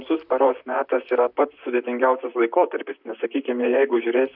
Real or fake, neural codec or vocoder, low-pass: fake; vocoder, 44.1 kHz, 128 mel bands, Pupu-Vocoder; 5.4 kHz